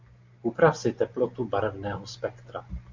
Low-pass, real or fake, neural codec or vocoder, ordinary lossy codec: 7.2 kHz; real; none; MP3, 64 kbps